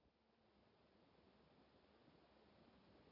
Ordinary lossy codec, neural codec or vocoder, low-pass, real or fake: none; none; 5.4 kHz; real